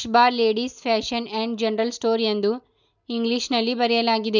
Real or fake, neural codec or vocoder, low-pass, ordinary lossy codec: real; none; 7.2 kHz; none